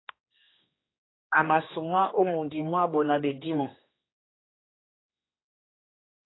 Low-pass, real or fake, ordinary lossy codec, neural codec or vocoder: 7.2 kHz; fake; AAC, 16 kbps; codec, 16 kHz, 2 kbps, X-Codec, HuBERT features, trained on general audio